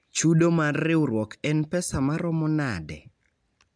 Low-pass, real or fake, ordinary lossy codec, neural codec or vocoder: 9.9 kHz; real; MP3, 96 kbps; none